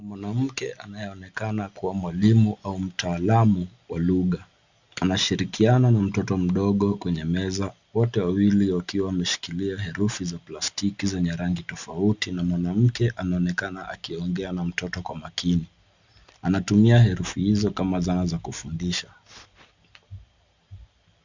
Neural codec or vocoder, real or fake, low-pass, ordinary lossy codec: none; real; 7.2 kHz; Opus, 64 kbps